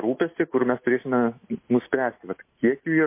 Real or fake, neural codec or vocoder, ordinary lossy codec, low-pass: real; none; MP3, 24 kbps; 3.6 kHz